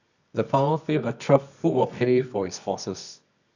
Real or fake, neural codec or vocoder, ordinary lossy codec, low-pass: fake; codec, 24 kHz, 0.9 kbps, WavTokenizer, medium music audio release; none; 7.2 kHz